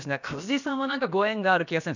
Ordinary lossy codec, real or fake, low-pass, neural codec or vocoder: none; fake; 7.2 kHz; codec, 16 kHz, about 1 kbps, DyCAST, with the encoder's durations